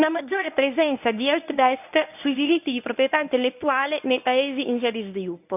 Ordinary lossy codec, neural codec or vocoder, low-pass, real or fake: none; codec, 24 kHz, 0.9 kbps, WavTokenizer, medium speech release version 1; 3.6 kHz; fake